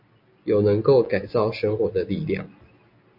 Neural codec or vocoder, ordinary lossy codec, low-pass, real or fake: none; MP3, 48 kbps; 5.4 kHz; real